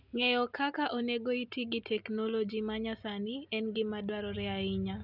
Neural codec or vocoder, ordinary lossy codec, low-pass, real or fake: none; none; 5.4 kHz; real